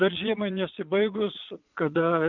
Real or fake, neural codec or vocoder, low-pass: fake; vocoder, 22.05 kHz, 80 mel bands, Vocos; 7.2 kHz